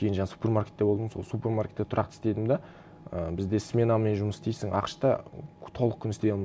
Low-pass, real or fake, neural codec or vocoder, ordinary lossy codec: none; real; none; none